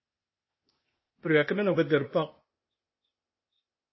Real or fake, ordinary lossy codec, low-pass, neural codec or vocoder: fake; MP3, 24 kbps; 7.2 kHz; codec, 16 kHz, 0.8 kbps, ZipCodec